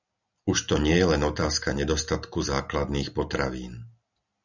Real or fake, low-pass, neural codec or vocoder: real; 7.2 kHz; none